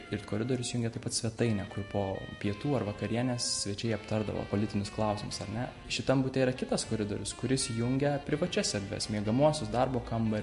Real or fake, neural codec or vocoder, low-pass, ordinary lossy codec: real; none; 14.4 kHz; MP3, 48 kbps